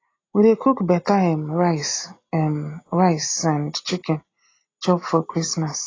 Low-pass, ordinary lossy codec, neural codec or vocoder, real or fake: 7.2 kHz; AAC, 32 kbps; none; real